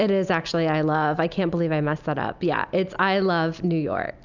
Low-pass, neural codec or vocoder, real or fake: 7.2 kHz; none; real